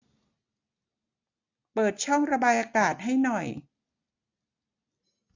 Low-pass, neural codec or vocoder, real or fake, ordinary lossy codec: 7.2 kHz; none; real; AAC, 48 kbps